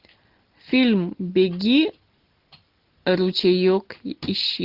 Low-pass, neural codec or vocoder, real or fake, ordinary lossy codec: 5.4 kHz; none; real; Opus, 16 kbps